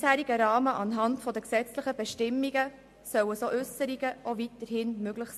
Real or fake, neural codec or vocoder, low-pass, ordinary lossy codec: real; none; 14.4 kHz; MP3, 64 kbps